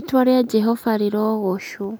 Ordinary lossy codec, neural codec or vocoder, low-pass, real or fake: none; none; none; real